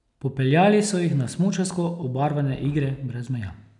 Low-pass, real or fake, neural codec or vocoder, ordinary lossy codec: 10.8 kHz; real; none; none